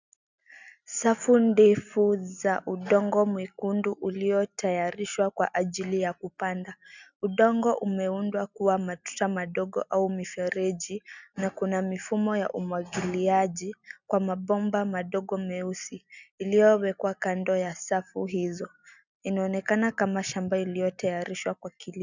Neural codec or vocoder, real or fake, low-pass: none; real; 7.2 kHz